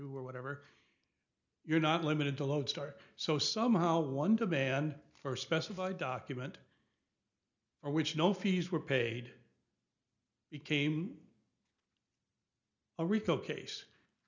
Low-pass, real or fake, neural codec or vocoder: 7.2 kHz; real; none